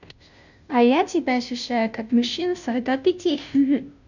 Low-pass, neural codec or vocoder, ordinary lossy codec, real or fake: 7.2 kHz; codec, 16 kHz, 0.5 kbps, FunCodec, trained on Chinese and English, 25 frames a second; none; fake